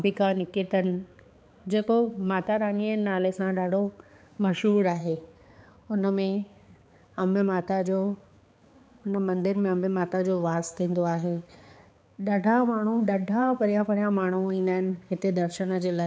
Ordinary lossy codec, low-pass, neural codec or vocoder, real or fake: none; none; codec, 16 kHz, 4 kbps, X-Codec, HuBERT features, trained on balanced general audio; fake